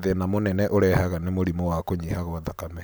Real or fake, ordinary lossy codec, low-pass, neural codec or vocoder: real; none; none; none